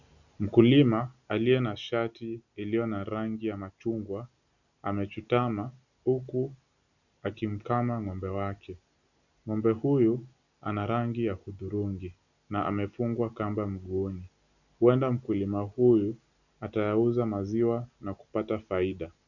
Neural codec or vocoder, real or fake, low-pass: none; real; 7.2 kHz